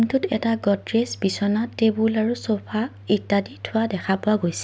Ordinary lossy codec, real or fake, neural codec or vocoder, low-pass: none; real; none; none